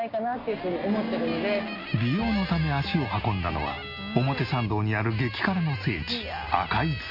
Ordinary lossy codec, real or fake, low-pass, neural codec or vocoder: MP3, 32 kbps; real; 5.4 kHz; none